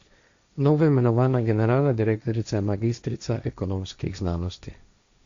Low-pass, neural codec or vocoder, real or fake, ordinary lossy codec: 7.2 kHz; codec, 16 kHz, 1.1 kbps, Voila-Tokenizer; fake; Opus, 64 kbps